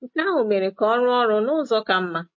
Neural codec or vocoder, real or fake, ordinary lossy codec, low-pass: none; real; MP3, 32 kbps; 7.2 kHz